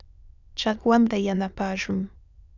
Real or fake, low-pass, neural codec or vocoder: fake; 7.2 kHz; autoencoder, 22.05 kHz, a latent of 192 numbers a frame, VITS, trained on many speakers